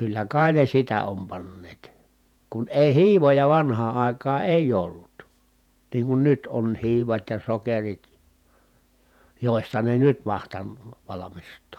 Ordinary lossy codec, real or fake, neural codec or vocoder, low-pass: none; fake; vocoder, 48 kHz, 128 mel bands, Vocos; 19.8 kHz